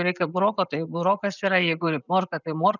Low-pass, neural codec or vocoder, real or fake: 7.2 kHz; none; real